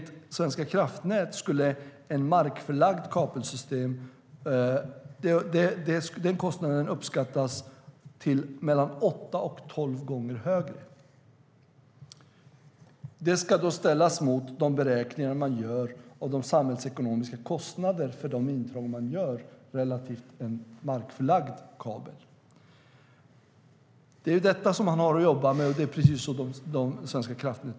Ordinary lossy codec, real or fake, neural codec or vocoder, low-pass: none; real; none; none